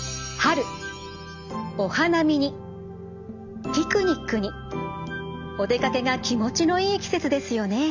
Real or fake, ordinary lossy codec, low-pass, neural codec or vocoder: real; none; 7.2 kHz; none